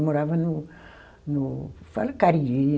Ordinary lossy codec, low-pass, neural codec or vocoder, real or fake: none; none; none; real